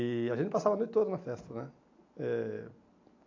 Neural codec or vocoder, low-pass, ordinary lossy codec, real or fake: none; 7.2 kHz; AAC, 48 kbps; real